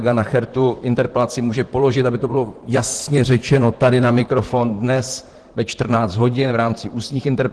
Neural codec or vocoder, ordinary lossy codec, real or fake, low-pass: vocoder, 44.1 kHz, 128 mel bands, Pupu-Vocoder; Opus, 16 kbps; fake; 10.8 kHz